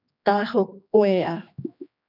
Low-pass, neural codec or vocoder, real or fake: 5.4 kHz; codec, 16 kHz, 2 kbps, X-Codec, HuBERT features, trained on general audio; fake